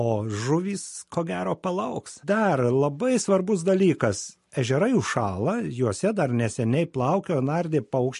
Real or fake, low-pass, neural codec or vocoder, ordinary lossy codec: real; 14.4 kHz; none; MP3, 48 kbps